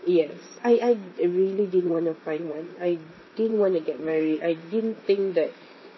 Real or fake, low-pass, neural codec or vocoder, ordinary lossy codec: fake; 7.2 kHz; vocoder, 44.1 kHz, 128 mel bands, Pupu-Vocoder; MP3, 24 kbps